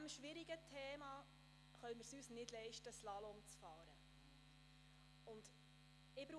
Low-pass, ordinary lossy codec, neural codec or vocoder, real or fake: none; none; none; real